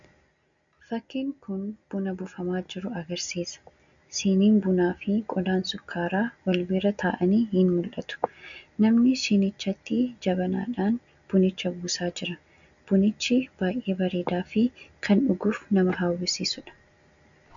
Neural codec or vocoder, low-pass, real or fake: none; 7.2 kHz; real